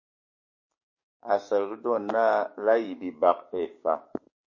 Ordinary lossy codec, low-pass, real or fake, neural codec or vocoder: MP3, 32 kbps; 7.2 kHz; fake; codec, 16 kHz, 6 kbps, DAC